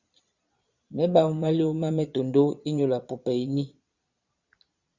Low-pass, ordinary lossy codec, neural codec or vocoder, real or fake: 7.2 kHz; Opus, 64 kbps; vocoder, 22.05 kHz, 80 mel bands, Vocos; fake